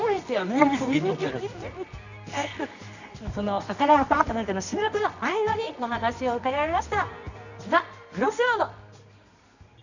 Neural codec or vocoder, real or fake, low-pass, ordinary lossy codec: codec, 24 kHz, 0.9 kbps, WavTokenizer, medium music audio release; fake; 7.2 kHz; none